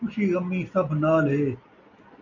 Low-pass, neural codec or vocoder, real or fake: 7.2 kHz; none; real